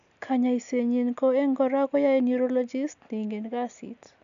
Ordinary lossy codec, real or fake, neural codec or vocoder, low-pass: MP3, 96 kbps; real; none; 7.2 kHz